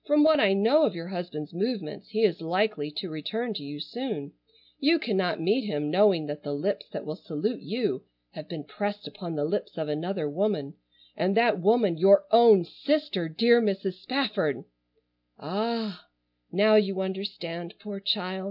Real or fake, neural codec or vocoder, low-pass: real; none; 5.4 kHz